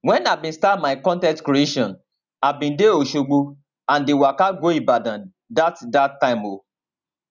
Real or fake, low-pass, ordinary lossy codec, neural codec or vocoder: real; 7.2 kHz; none; none